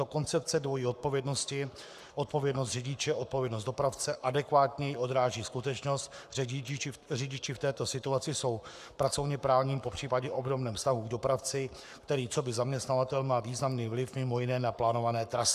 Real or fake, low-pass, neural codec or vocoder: fake; 14.4 kHz; codec, 44.1 kHz, 7.8 kbps, Pupu-Codec